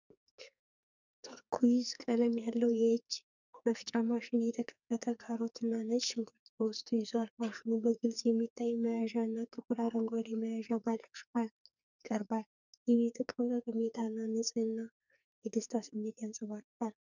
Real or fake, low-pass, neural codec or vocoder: fake; 7.2 kHz; codec, 44.1 kHz, 2.6 kbps, SNAC